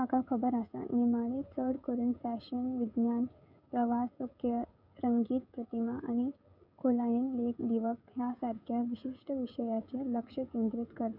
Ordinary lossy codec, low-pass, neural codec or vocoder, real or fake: none; 5.4 kHz; codec, 16 kHz, 16 kbps, FreqCodec, smaller model; fake